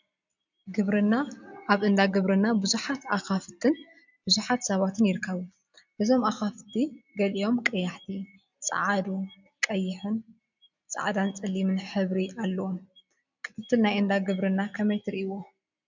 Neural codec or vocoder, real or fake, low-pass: none; real; 7.2 kHz